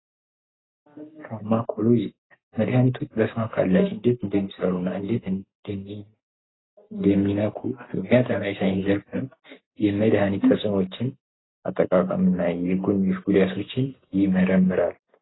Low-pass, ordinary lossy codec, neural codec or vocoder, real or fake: 7.2 kHz; AAC, 16 kbps; none; real